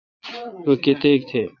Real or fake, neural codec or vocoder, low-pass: fake; vocoder, 22.05 kHz, 80 mel bands, Vocos; 7.2 kHz